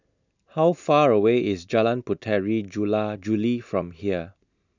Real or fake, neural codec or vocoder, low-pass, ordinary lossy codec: real; none; 7.2 kHz; none